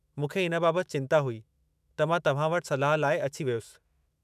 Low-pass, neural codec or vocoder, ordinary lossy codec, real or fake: 14.4 kHz; autoencoder, 48 kHz, 128 numbers a frame, DAC-VAE, trained on Japanese speech; none; fake